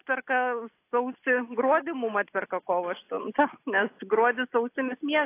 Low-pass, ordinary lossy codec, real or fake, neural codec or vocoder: 3.6 kHz; AAC, 24 kbps; real; none